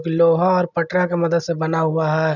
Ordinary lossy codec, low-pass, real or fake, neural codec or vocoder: none; 7.2 kHz; real; none